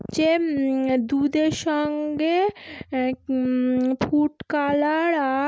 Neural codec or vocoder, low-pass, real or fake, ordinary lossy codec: none; none; real; none